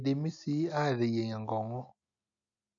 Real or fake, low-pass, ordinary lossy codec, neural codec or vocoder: real; 7.2 kHz; none; none